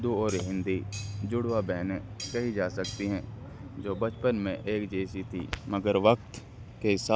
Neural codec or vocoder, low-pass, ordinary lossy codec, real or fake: none; none; none; real